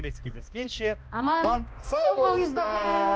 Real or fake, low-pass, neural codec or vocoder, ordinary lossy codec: fake; none; codec, 16 kHz, 1 kbps, X-Codec, HuBERT features, trained on balanced general audio; none